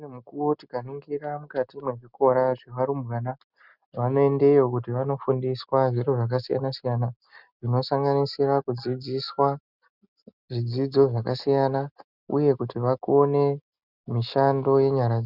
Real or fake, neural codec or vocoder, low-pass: real; none; 5.4 kHz